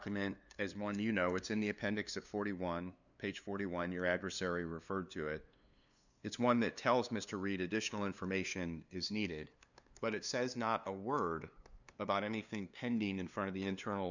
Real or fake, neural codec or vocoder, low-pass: fake; codec, 16 kHz, 2 kbps, FunCodec, trained on LibriTTS, 25 frames a second; 7.2 kHz